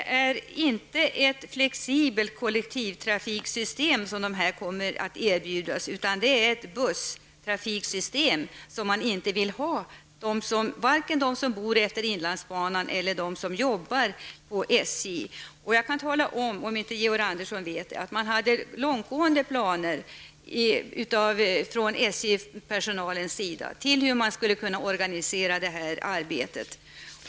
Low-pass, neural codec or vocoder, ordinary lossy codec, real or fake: none; none; none; real